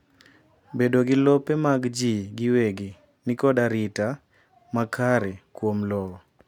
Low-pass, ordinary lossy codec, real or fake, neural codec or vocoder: 19.8 kHz; none; real; none